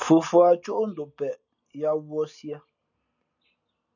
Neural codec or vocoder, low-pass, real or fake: none; 7.2 kHz; real